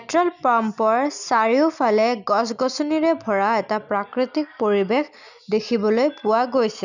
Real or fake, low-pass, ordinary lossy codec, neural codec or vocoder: real; 7.2 kHz; none; none